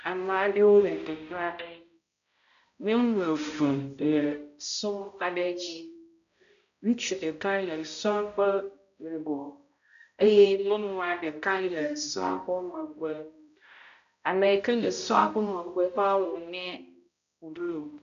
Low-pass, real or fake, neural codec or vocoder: 7.2 kHz; fake; codec, 16 kHz, 0.5 kbps, X-Codec, HuBERT features, trained on balanced general audio